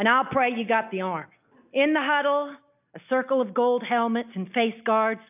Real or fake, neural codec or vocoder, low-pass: real; none; 3.6 kHz